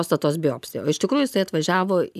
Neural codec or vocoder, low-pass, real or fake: none; 14.4 kHz; real